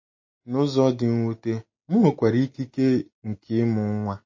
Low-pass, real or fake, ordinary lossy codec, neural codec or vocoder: 7.2 kHz; real; MP3, 32 kbps; none